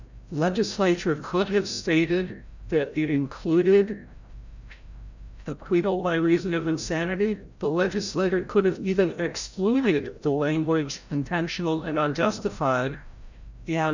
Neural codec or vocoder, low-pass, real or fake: codec, 16 kHz, 0.5 kbps, FreqCodec, larger model; 7.2 kHz; fake